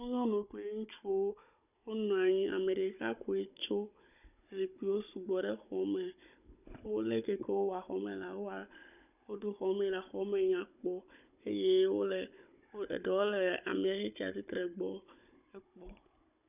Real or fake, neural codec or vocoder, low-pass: fake; codec, 16 kHz, 16 kbps, FunCodec, trained on Chinese and English, 50 frames a second; 3.6 kHz